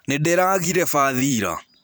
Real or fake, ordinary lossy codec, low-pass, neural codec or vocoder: fake; none; none; vocoder, 44.1 kHz, 128 mel bands every 512 samples, BigVGAN v2